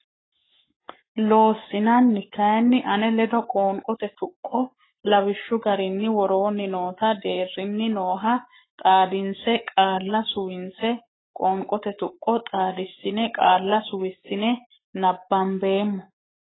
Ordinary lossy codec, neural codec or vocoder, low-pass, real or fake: AAC, 16 kbps; codec, 44.1 kHz, 7.8 kbps, Pupu-Codec; 7.2 kHz; fake